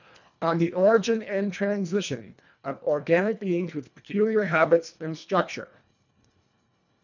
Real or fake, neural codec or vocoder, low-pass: fake; codec, 24 kHz, 1.5 kbps, HILCodec; 7.2 kHz